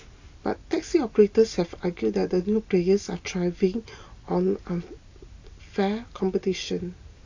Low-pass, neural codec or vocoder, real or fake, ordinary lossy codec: 7.2 kHz; none; real; AAC, 48 kbps